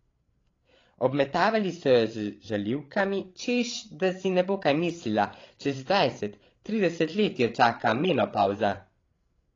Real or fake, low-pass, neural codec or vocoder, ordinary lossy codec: fake; 7.2 kHz; codec, 16 kHz, 8 kbps, FreqCodec, larger model; AAC, 32 kbps